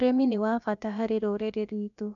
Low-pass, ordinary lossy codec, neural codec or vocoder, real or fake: 7.2 kHz; none; codec, 16 kHz, about 1 kbps, DyCAST, with the encoder's durations; fake